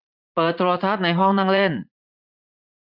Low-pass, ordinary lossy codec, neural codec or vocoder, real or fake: 5.4 kHz; none; vocoder, 44.1 kHz, 80 mel bands, Vocos; fake